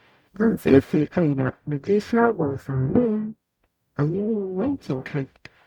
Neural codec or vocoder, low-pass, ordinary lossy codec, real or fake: codec, 44.1 kHz, 0.9 kbps, DAC; 19.8 kHz; MP3, 96 kbps; fake